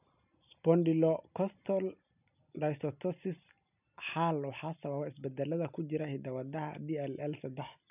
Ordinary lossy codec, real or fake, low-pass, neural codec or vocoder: none; real; 3.6 kHz; none